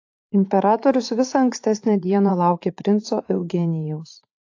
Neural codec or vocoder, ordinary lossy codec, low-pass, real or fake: vocoder, 44.1 kHz, 80 mel bands, Vocos; AAC, 48 kbps; 7.2 kHz; fake